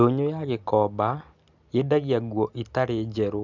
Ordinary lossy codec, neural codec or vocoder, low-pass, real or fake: none; none; 7.2 kHz; real